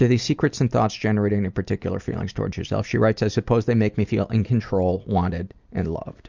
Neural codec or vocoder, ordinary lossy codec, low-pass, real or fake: none; Opus, 64 kbps; 7.2 kHz; real